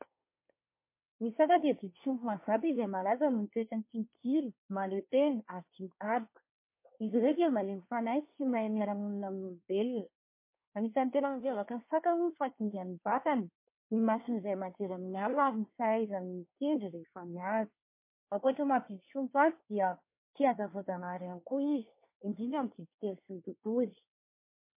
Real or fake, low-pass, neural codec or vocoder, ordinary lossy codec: fake; 3.6 kHz; codec, 24 kHz, 1 kbps, SNAC; MP3, 24 kbps